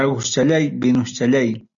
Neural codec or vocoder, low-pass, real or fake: none; 7.2 kHz; real